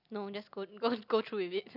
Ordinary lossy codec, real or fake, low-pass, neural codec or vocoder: none; real; 5.4 kHz; none